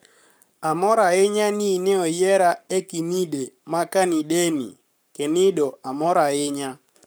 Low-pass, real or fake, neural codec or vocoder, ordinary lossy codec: none; fake; codec, 44.1 kHz, 7.8 kbps, Pupu-Codec; none